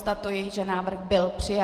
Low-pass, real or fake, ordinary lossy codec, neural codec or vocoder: 14.4 kHz; fake; Opus, 24 kbps; vocoder, 48 kHz, 128 mel bands, Vocos